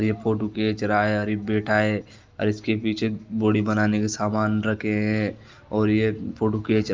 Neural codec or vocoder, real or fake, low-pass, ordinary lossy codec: none; real; 7.2 kHz; Opus, 24 kbps